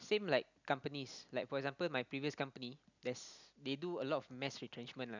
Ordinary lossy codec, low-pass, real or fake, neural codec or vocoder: none; 7.2 kHz; real; none